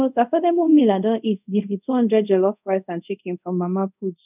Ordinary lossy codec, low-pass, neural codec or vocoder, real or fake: none; 3.6 kHz; codec, 24 kHz, 0.5 kbps, DualCodec; fake